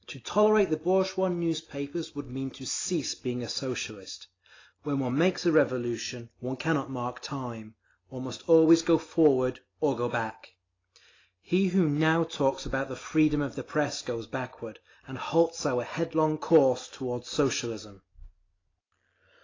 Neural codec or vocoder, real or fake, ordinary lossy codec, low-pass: none; real; AAC, 32 kbps; 7.2 kHz